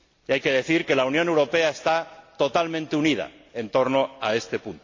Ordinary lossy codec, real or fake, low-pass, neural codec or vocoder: AAC, 48 kbps; real; 7.2 kHz; none